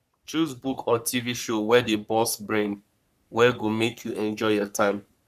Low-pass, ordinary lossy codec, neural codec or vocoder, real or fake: 14.4 kHz; none; codec, 44.1 kHz, 3.4 kbps, Pupu-Codec; fake